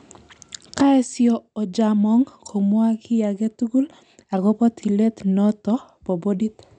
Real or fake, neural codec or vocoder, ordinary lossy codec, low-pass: real; none; none; 9.9 kHz